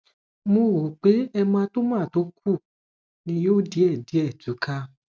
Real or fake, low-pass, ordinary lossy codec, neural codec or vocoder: real; none; none; none